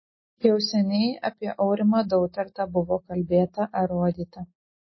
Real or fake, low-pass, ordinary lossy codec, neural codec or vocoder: real; 7.2 kHz; MP3, 24 kbps; none